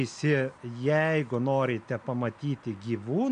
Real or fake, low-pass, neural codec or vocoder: real; 9.9 kHz; none